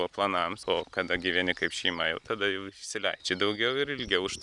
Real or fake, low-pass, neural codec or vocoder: real; 10.8 kHz; none